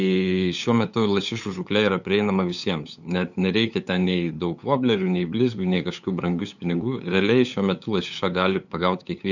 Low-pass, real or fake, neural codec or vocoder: 7.2 kHz; fake; codec, 16 kHz, 8 kbps, FunCodec, trained on LibriTTS, 25 frames a second